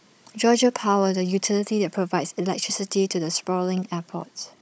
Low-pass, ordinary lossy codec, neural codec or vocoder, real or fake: none; none; codec, 16 kHz, 16 kbps, FunCodec, trained on Chinese and English, 50 frames a second; fake